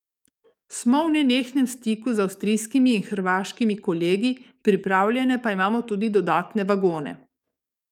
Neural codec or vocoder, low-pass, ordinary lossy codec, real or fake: codec, 44.1 kHz, 7.8 kbps, DAC; 19.8 kHz; none; fake